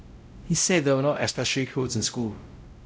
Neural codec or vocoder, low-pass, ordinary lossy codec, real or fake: codec, 16 kHz, 0.5 kbps, X-Codec, WavLM features, trained on Multilingual LibriSpeech; none; none; fake